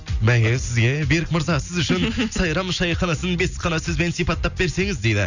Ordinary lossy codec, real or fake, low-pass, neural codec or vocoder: none; real; 7.2 kHz; none